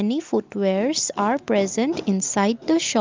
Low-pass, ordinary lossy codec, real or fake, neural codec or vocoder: 7.2 kHz; Opus, 24 kbps; real; none